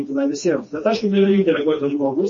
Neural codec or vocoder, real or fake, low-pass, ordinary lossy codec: codec, 16 kHz, 2 kbps, FreqCodec, smaller model; fake; 7.2 kHz; MP3, 32 kbps